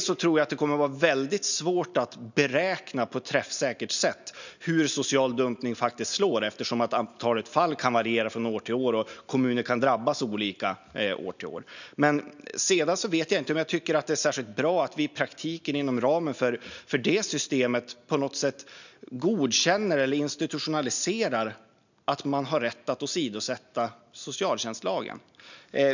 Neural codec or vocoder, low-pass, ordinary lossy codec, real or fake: none; 7.2 kHz; none; real